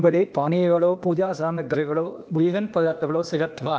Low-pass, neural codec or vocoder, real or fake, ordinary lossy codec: none; codec, 16 kHz, 0.8 kbps, ZipCodec; fake; none